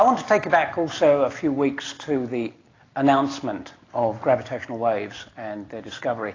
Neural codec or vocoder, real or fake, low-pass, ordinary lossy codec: none; real; 7.2 kHz; AAC, 32 kbps